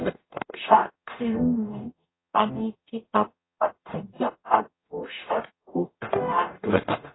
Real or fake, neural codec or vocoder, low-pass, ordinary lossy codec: fake; codec, 44.1 kHz, 0.9 kbps, DAC; 7.2 kHz; AAC, 16 kbps